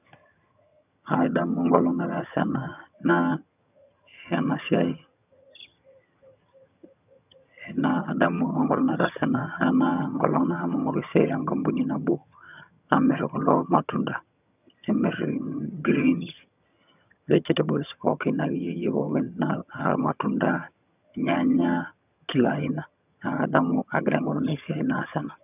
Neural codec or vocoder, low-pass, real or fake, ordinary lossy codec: vocoder, 22.05 kHz, 80 mel bands, HiFi-GAN; 3.6 kHz; fake; none